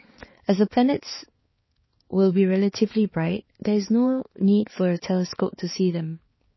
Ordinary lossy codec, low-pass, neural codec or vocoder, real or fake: MP3, 24 kbps; 7.2 kHz; codec, 16 kHz, 4 kbps, X-Codec, HuBERT features, trained on balanced general audio; fake